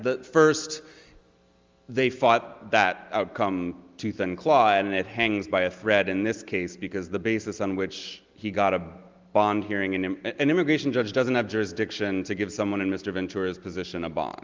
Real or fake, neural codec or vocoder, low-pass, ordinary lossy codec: real; none; 7.2 kHz; Opus, 32 kbps